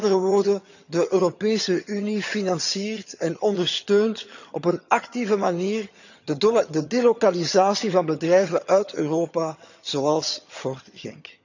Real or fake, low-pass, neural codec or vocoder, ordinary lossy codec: fake; 7.2 kHz; vocoder, 22.05 kHz, 80 mel bands, HiFi-GAN; none